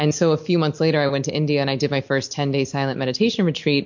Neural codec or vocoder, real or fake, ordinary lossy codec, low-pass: none; real; MP3, 48 kbps; 7.2 kHz